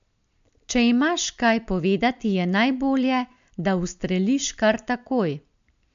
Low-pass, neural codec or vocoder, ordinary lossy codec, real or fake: 7.2 kHz; none; MP3, 64 kbps; real